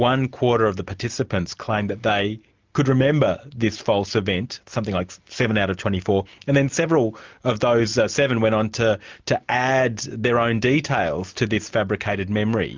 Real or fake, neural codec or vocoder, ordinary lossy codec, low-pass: real; none; Opus, 24 kbps; 7.2 kHz